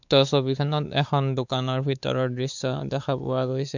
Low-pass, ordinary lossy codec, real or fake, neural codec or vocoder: 7.2 kHz; none; fake; codec, 16 kHz, 4 kbps, X-Codec, WavLM features, trained on Multilingual LibriSpeech